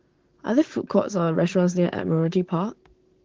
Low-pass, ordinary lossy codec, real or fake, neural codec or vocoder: 7.2 kHz; Opus, 16 kbps; fake; codec, 16 kHz, 6 kbps, DAC